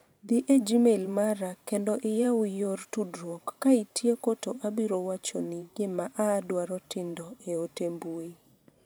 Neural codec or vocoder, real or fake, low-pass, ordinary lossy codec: vocoder, 44.1 kHz, 128 mel bands every 512 samples, BigVGAN v2; fake; none; none